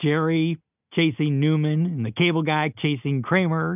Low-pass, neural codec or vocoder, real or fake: 3.6 kHz; none; real